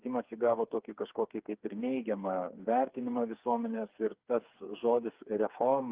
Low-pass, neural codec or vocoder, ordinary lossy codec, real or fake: 3.6 kHz; codec, 16 kHz, 4 kbps, FreqCodec, smaller model; Opus, 32 kbps; fake